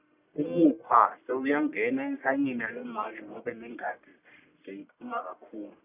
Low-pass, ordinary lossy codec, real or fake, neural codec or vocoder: 3.6 kHz; none; fake; codec, 44.1 kHz, 1.7 kbps, Pupu-Codec